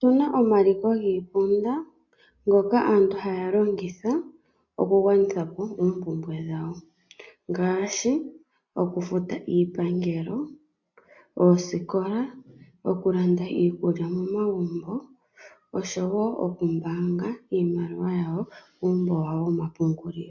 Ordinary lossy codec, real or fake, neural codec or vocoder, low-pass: MP3, 48 kbps; real; none; 7.2 kHz